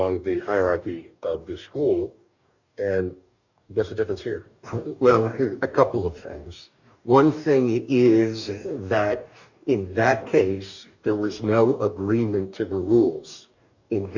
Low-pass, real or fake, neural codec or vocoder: 7.2 kHz; fake; codec, 44.1 kHz, 2.6 kbps, DAC